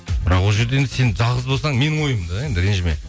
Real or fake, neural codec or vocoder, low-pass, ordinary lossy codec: real; none; none; none